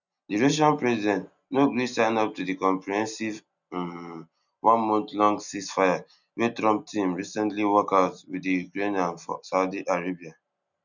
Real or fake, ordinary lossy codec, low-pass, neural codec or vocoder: real; none; 7.2 kHz; none